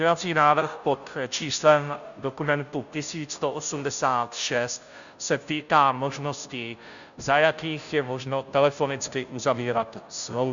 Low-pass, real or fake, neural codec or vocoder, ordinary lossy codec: 7.2 kHz; fake; codec, 16 kHz, 0.5 kbps, FunCodec, trained on Chinese and English, 25 frames a second; MP3, 96 kbps